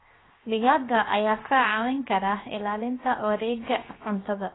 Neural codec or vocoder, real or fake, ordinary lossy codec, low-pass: codec, 16 kHz, 0.7 kbps, FocalCodec; fake; AAC, 16 kbps; 7.2 kHz